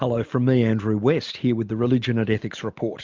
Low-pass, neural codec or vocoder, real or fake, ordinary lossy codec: 7.2 kHz; none; real; Opus, 24 kbps